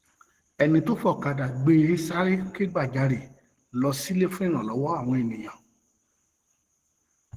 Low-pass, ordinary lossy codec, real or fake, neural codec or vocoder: 14.4 kHz; Opus, 16 kbps; fake; codec, 44.1 kHz, 7.8 kbps, Pupu-Codec